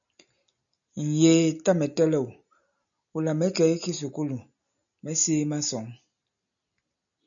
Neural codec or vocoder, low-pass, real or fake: none; 7.2 kHz; real